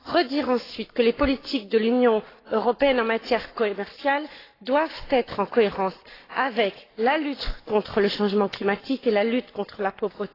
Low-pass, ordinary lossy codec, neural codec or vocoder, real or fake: 5.4 kHz; AAC, 24 kbps; codec, 44.1 kHz, 7.8 kbps, Pupu-Codec; fake